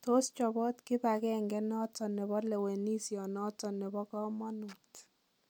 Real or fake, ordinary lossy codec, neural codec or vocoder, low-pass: real; MP3, 96 kbps; none; 19.8 kHz